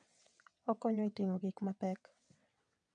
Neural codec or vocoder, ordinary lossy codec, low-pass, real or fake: vocoder, 22.05 kHz, 80 mel bands, WaveNeXt; MP3, 64 kbps; 9.9 kHz; fake